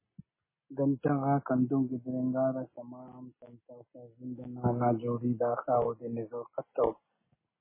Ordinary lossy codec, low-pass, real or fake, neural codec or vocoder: MP3, 16 kbps; 3.6 kHz; real; none